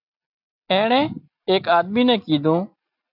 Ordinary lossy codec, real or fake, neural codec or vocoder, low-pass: AAC, 48 kbps; real; none; 5.4 kHz